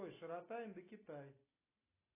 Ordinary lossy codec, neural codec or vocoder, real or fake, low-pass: AAC, 24 kbps; none; real; 3.6 kHz